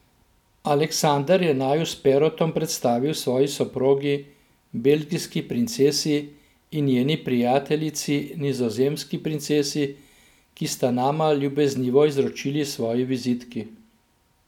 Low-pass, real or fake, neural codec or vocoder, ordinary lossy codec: 19.8 kHz; real; none; none